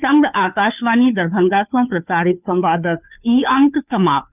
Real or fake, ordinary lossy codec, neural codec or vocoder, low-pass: fake; none; codec, 16 kHz, 2 kbps, FunCodec, trained on Chinese and English, 25 frames a second; 3.6 kHz